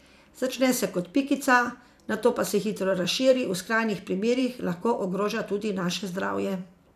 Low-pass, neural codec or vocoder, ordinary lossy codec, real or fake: 14.4 kHz; vocoder, 44.1 kHz, 128 mel bands every 512 samples, BigVGAN v2; none; fake